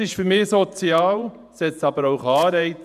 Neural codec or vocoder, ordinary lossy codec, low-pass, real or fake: vocoder, 48 kHz, 128 mel bands, Vocos; none; 14.4 kHz; fake